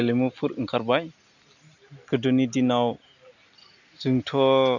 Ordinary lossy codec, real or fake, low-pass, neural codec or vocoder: none; real; 7.2 kHz; none